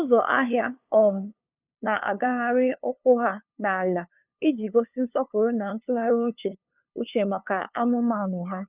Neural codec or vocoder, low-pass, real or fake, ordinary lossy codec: codec, 16 kHz, 2 kbps, FunCodec, trained on LibriTTS, 25 frames a second; 3.6 kHz; fake; none